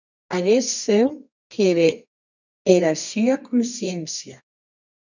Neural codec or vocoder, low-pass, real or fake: codec, 24 kHz, 0.9 kbps, WavTokenizer, medium music audio release; 7.2 kHz; fake